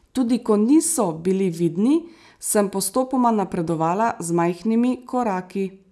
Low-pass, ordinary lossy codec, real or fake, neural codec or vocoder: none; none; real; none